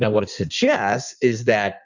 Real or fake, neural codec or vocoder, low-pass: fake; codec, 16 kHz in and 24 kHz out, 1.1 kbps, FireRedTTS-2 codec; 7.2 kHz